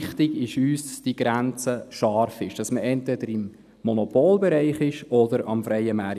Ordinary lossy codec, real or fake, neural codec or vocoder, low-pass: none; real; none; 14.4 kHz